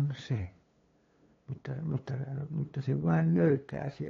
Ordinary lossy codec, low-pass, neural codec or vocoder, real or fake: AAC, 32 kbps; 7.2 kHz; codec, 16 kHz, 2 kbps, FunCodec, trained on LibriTTS, 25 frames a second; fake